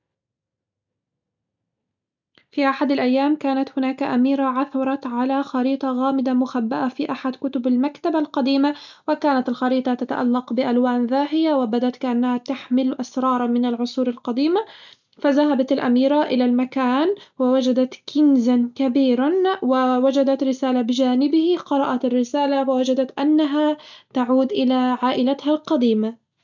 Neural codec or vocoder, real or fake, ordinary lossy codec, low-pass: none; real; none; 7.2 kHz